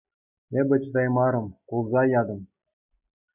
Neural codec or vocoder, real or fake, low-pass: none; real; 3.6 kHz